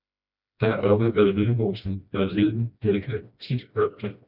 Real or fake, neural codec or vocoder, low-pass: fake; codec, 16 kHz, 1 kbps, FreqCodec, smaller model; 5.4 kHz